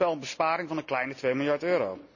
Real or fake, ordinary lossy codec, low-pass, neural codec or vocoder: real; none; 7.2 kHz; none